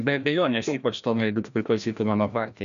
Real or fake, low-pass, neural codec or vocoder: fake; 7.2 kHz; codec, 16 kHz, 1 kbps, FreqCodec, larger model